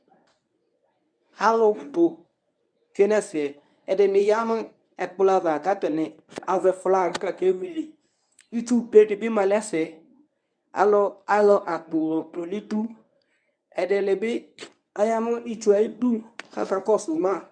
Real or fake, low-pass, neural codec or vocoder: fake; 9.9 kHz; codec, 24 kHz, 0.9 kbps, WavTokenizer, medium speech release version 1